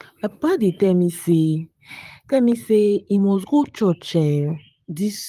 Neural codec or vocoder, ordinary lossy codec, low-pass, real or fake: vocoder, 44.1 kHz, 128 mel bands, Pupu-Vocoder; Opus, 32 kbps; 14.4 kHz; fake